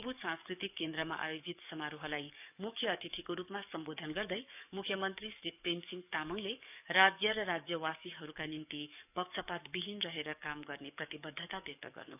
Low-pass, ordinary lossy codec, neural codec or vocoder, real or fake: 3.6 kHz; none; codec, 44.1 kHz, 7.8 kbps, DAC; fake